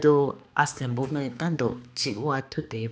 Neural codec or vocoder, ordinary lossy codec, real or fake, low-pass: codec, 16 kHz, 2 kbps, X-Codec, HuBERT features, trained on balanced general audio; none; fake; none